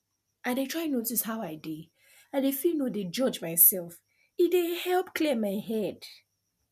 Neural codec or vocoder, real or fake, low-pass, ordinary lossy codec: none; real; 14.4 kHz; none